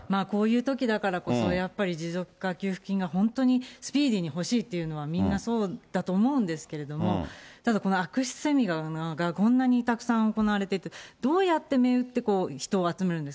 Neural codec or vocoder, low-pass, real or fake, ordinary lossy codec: none; none; real; none